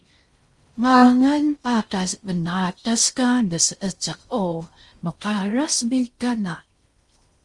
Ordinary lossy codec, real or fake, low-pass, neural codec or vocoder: Opus, 32 kbps; fake; 10.8 kHz; codec, 16 kHz in and 24 kHz out, 0.6 kbps, FocalCodec, streaming, 4096 codes